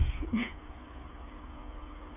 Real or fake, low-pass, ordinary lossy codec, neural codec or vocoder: fake; 3.6 kHz; none; codec, 16 kHz, 8 kbps, FreqCodec, smaller model